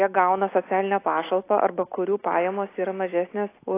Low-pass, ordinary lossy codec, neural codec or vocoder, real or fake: 3.6 kHz; AAC, 24 kbps; none; real